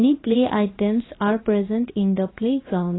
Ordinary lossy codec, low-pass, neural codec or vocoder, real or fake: AAC, 16 kbps; 7.2 kHz; codec, 16 kHz, about 1 kbps, DyCAST, with the encoder's durations; fake